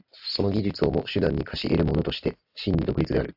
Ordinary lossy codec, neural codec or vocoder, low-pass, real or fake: AAC, 48 kbps; none; 5.4 kHz; real